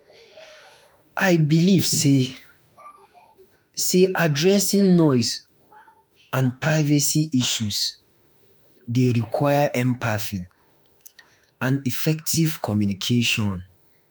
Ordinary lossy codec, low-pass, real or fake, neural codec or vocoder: none; none; fake; autoencoder, 48 kHz, 32 numbers a frame, DAC-VAE, trained on Japanese speech